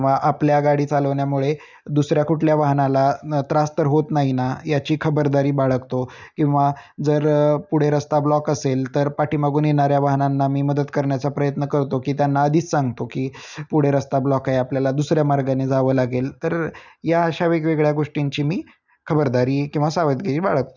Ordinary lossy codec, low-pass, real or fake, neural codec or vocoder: none; 7.2 kHz; real; none